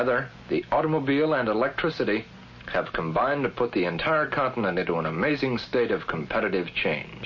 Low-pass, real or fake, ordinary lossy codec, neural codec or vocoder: 7.2 kHz; real; MP3, 32 kbps; none